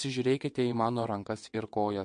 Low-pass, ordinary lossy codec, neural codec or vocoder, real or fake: 9.9 kHz; MP3, 48 kbps; vocoder, 22.05 kHz, 80 mel bands, WaveNeXt; fake